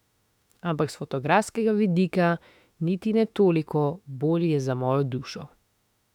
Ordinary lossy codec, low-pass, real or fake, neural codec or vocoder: none; 19.8 kHz; fake; autoencoder, 48 kHz, 32 numbers a frame, DAC-VAE, trained on Japanese speech